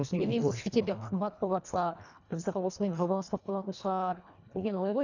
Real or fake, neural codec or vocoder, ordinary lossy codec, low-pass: fake; codec, 24 kHz, 1.5 kbps, HILCodec; none; 7.2 kHz